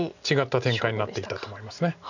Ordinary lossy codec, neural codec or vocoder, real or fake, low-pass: none; none; real; 7.2 kHz